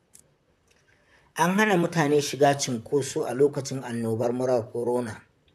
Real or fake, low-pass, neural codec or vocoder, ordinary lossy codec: fake; 14.4 kHz; vocoder, 44.1 kHz, 128 mel bands, Pupu-Vocoder; AAC, 96 kbps